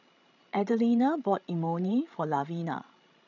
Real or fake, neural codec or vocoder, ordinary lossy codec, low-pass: fake; codec, 16 kHz, 16 kbps, FreqCodec, larger model; none; 7.2 kHz